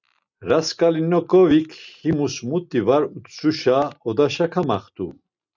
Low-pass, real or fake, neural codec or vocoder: 7.2 kHz; real; none